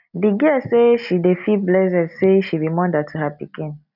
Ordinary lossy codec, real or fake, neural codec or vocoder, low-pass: none; real; none; 5.4 kHz